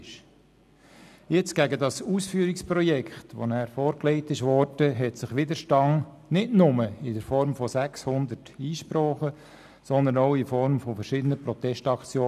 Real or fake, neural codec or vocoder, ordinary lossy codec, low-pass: real; none; none; 14.4 kHz